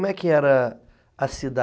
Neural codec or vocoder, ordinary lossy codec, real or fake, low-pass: none; none; real; none